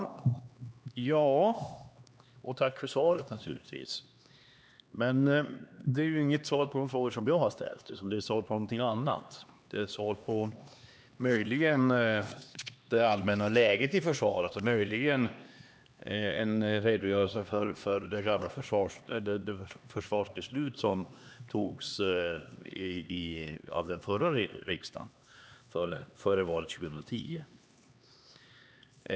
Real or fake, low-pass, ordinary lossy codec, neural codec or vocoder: fake; none; none; codec, 16 kHz, 2 kbps, X-Codec, HuBERT features, trained on LibriSpeech